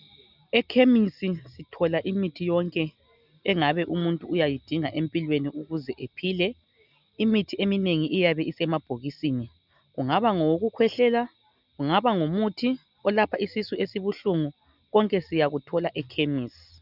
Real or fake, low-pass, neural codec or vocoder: real; 5.4 kHz; none